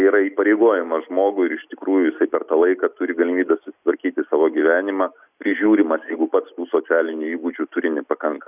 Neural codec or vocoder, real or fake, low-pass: none; real; 3.6 kHz